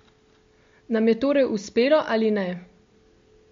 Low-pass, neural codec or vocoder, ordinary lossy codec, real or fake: 7.2 kHz; none; MP3, 48 kbps; real